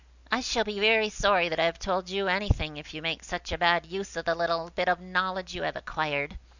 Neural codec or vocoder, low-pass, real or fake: none; 7.2 kHz; real